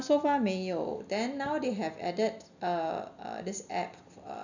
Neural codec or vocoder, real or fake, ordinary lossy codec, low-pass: none; real; none; 7.2 kHz